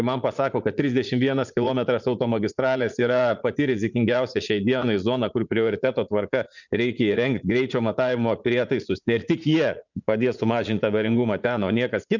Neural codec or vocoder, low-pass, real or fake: vocoder, 44.1 kHz, 80 mel bands, Vocos; 7.2 kHz; fake